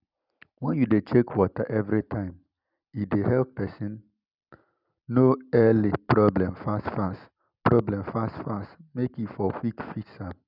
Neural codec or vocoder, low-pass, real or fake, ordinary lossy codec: none; 5.4 kHz; real; none